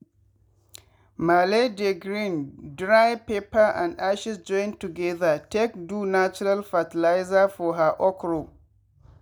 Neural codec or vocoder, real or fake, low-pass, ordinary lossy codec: none; real; none; none